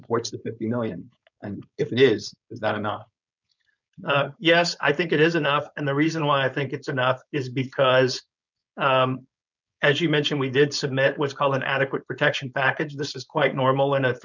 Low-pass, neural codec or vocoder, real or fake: 7.2 kHz; codec, 16 kHz, 4.8 kbps, FACodec; fake